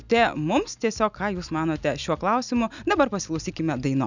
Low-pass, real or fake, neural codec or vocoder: 7.2 kHz; real; none